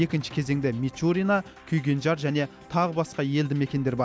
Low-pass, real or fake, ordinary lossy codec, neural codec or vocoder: none; real; none; none